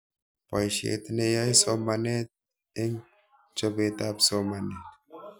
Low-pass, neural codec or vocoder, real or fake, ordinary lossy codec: none; none; real; none